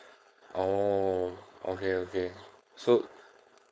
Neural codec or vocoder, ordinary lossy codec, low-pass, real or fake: codec, 16 kHz, 4.8 kbps, FACodec; none; none; fake